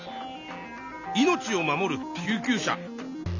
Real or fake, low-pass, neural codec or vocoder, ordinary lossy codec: real; 7.2 kHz; none; none